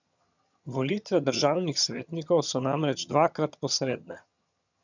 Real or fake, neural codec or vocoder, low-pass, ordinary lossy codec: fake; vocoder, 22.05 kHz, 80 mel bands, HiFi-GAN; 7.2 kHz; none